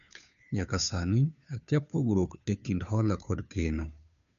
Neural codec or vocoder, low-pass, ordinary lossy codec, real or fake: codec, 16 kHz, 2 kbps, FunCodec, trained on Chinese and English, 25 frames a second; 7.2 kHz; none; fake